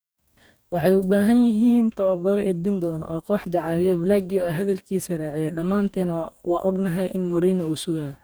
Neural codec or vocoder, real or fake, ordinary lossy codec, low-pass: codec, 44.1 kHz, 2.6 kbps, DAC; fake; none; none